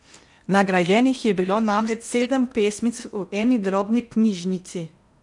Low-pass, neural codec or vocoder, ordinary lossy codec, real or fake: 10.8 kHz; codec, 16 kHz in and 24 kHz out, 0.8 kbps, FocalCodec, streaming, 65536 codes; none; fake